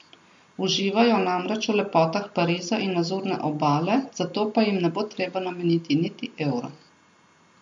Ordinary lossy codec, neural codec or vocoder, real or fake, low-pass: MP3, 48 kbps; none; real; 10.8 kHz